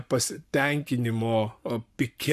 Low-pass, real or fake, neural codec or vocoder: 14.4 kHz; fake; codec, 44.1 kHz, 7.8 kbps, Pupu-Codec